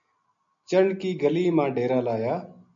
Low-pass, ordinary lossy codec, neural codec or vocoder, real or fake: 7.2 kHz; MP3, 48 kbps; none; real